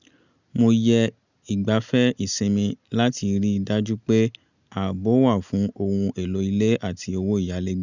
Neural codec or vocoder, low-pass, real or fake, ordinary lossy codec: none; 7.2 kHz; real; none